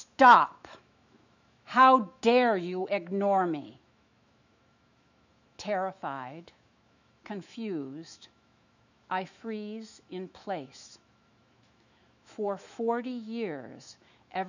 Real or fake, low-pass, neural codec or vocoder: fake; 7.2 kHz; autoencoder, 48 kHz, 128 numbers a frame, DAC-VAE, trained on Japanese speech